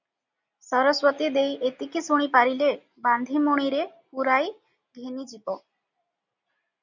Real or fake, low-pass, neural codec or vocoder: real; 7.2 kHz; none